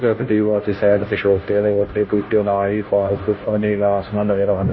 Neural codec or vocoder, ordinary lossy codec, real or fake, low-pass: codec, 16 kHz, 0.5 kbps, FunCodec, trained on Chinese and English, 25 frames a second; MP3, 24 kbps; fake; 7.2 kHz